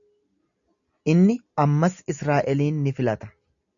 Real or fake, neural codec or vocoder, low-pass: real; none; 7.2 kHz